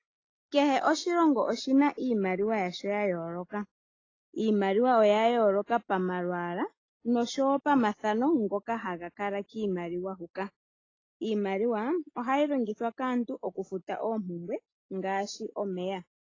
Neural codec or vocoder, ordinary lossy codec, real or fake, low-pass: none; AAC, 32 kbps; real; 7.2 kHz